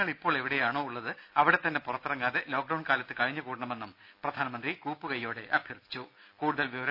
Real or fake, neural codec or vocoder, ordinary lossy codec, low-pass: real; none; none; 5.4 kHz